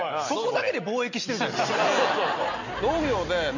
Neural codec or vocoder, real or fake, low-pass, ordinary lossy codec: none; real; 7.2 kHz; none